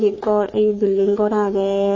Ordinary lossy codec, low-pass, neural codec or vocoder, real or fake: MP3, 32 kbps; 7.2 kHz; codec, 44.1 kHz, 3.4 kbps, Pupu-Codec; fake